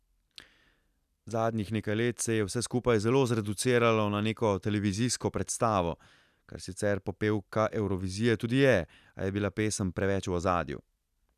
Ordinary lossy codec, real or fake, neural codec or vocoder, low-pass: none; real; none; 14.4 kHz